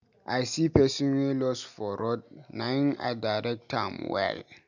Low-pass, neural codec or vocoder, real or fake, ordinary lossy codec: 7.2 kHz; none; real; none